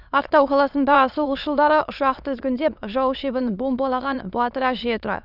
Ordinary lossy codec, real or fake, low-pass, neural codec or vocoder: none; fake; 5.4 kHz; autoencoder, 22.05 kHz, a latent of 192 numbers a frame, VITS, trained on many speakers